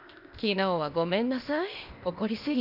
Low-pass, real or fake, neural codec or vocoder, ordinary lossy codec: 5.4 kHz; fake; codec, 16 kHz, 0.8 kbps, ZipCodec; none